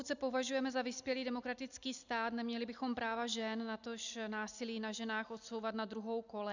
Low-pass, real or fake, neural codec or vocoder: 7.2 kHz; real; none